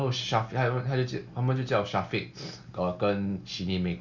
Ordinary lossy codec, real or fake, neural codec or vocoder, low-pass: Opus, 64 kbps; real; none; 7.2 kHz